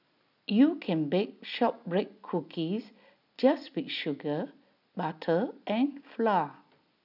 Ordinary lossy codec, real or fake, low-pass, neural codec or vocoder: none; real; 5.4 kHz; none